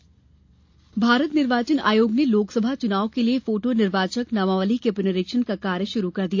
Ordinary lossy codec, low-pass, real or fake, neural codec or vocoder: AAC, 48 kbps; 7.2 kHz; real; none